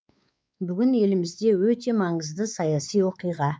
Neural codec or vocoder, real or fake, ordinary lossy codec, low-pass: codec, 16 kHz, 4 kbps, X-Codec, WavLM features, trained on Multilingual LibriSpeech; fake; none; none